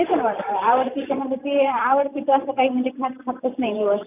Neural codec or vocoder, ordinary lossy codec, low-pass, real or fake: vocoder, 44.1 kHz, 128 mel bands every 512 samples, BigVGAN v2; none; 3.6 kHz; fake